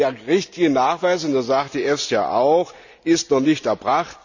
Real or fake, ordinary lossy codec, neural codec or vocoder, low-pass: real; none; none; 7.2 kHz